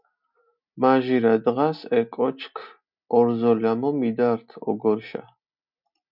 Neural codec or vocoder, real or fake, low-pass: none; real; 5.4 kHz